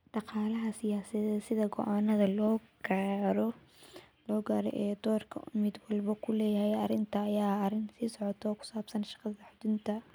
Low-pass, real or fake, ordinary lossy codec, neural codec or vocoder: none; real; none; none